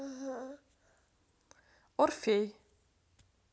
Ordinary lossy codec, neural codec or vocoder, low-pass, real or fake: none; none; none; real